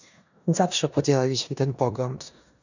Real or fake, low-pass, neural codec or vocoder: fake; 7.2 kHz; codec, 16 kHz in and 24 kHz out, 0.9 kbps, LongCat-Audio-Codec, four codebook decoder